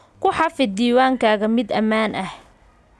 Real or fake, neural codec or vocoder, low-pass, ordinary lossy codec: real; none; none; none